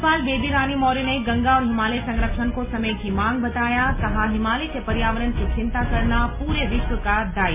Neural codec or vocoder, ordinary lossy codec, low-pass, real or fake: none; MP3, 32 kbps; 3.6 kHz; real